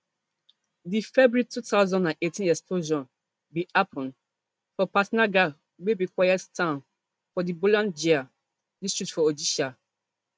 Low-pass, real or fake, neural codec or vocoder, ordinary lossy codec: none; real; none; none